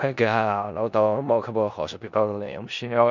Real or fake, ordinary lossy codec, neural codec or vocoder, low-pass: fake; none; codec, 16 kHz in and 24 kHz out, 0.4 kbps, LongCat-Audio-Codec, four codebook decoder; 7.2 kHz